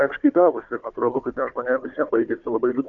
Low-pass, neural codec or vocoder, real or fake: 7.2 kHz; codec, 16 kHz, 4 kbps, FunCodec, trained on Chinese and English, 50 frames a second; fake